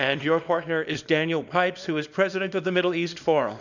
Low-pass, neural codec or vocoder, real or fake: 7.2 kHz; codec, 24 kHz, 0.9 kbps, WavTokenizer, small release; fake